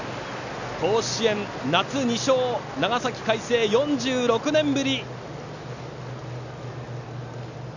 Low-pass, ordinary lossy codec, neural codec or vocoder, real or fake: 7.2 kHz; none; none; real